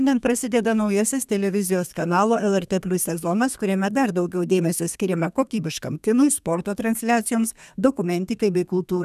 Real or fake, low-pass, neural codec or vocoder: fake; 14.4 kHz; codec, 32 kHz, 1.9 kbps, SNAC